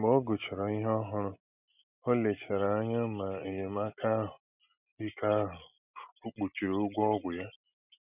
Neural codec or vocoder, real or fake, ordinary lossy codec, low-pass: none; real; none; 3.6 kHz